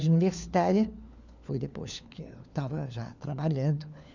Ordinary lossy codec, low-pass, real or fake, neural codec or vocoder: none; 7.2 kHz; fake; codec, 16 kHz, 4 kbps, FunCodec, trained on LibriTTS, 50 frames a second